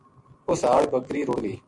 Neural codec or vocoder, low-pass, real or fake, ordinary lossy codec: none; 10.8 kHz; real; MP3, 48 kbps